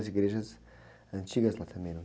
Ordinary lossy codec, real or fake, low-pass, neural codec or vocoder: none; real; none; none